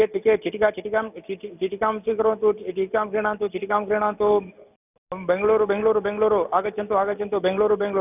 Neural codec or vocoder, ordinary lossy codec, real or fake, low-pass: none; none; real; 3.6 kHz